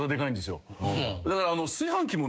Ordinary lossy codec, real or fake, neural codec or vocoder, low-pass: none; fake; codec, 16 kHz, 6 kbps, DAC; none